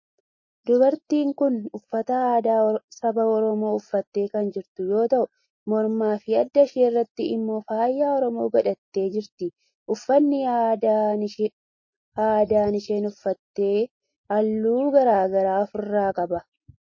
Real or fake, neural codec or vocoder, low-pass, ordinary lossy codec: real; none; 7.2 kHz; MP3, 32 kbps